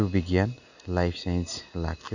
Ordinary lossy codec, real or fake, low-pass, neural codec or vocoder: none; real; 7.2 kHz; none